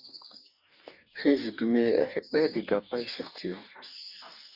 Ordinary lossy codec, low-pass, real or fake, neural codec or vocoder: Opus, 64 kbps; 5.4 kHz; fake; codec, 44.1 kHz, 2.6 kbps, DAC